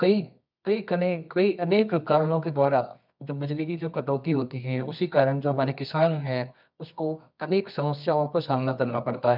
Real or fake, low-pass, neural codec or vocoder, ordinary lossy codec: fake; 5.4 kHz; codec, 24 kHz, 0.9 kbps, WavTokenizer, medium music audio release; none